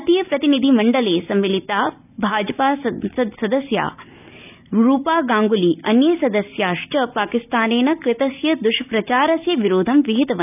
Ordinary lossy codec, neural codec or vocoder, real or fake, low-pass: none; none; real; 3.6 kHz